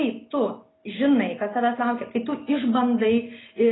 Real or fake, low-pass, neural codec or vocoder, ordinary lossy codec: real; 7.2 kHz; none; AAC, 16 kbps